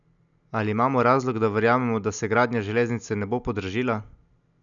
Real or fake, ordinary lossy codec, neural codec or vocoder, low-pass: real; none; none; 7.2 kHz